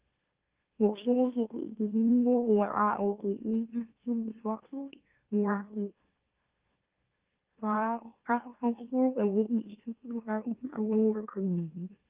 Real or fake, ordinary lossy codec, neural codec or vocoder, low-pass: fake; Opus, 32 kbps; autoencoder, 44.1 kHz, a latent of 192 numbers a frame, MeloTTS; 3.6 kHz